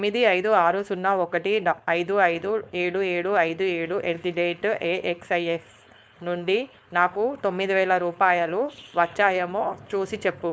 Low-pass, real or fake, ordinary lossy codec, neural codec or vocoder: none; fake; none; codec, 16 kHz, 4.8 kbps, FACodec